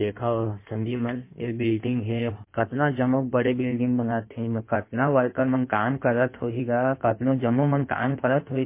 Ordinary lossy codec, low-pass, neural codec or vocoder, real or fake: MP3, 24 kbps; 3.6 kHz; codec, 16 kHz in and 24 kHz out, 1.1 kbps, FireRedTTS-2 codec; fake